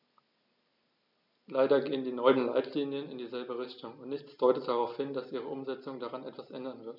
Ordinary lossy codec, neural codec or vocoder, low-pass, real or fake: none; none; 5.4 kHz; real